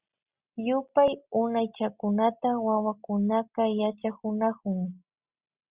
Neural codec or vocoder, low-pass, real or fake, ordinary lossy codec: none; 3.6 kHz; real; Opus, 64 kbps